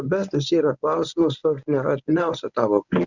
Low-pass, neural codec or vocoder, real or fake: 7.2 kHz; codec, 24 kHz, 0.9 kbps, WavTokenizer, medium speech release version 1; fake